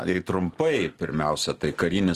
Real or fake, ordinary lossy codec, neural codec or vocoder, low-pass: real; Opus, 24 kbps; none; 14.4 kHz